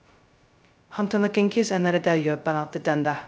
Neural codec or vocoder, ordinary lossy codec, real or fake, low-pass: codec, 16 kHz, 0.2 kbps, FocalCodec; none; fake; none